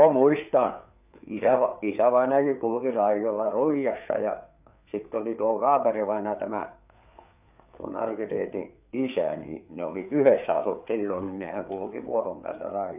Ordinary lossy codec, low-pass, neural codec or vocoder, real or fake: none; 3.6 kHz; codec, 16 kHz, 4 kbps, FreqCodec, larger model; fake